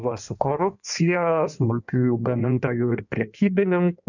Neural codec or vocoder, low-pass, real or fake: codec, 16 kHz in and 24 kHz out, 1.1 kbps, FireRedTTS-2 codec; 7.2 kHz; fake